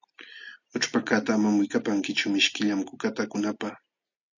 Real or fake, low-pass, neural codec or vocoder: real; 7.2 kHz; none